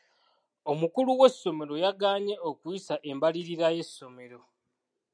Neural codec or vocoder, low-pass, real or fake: none; 9.9 kHz; real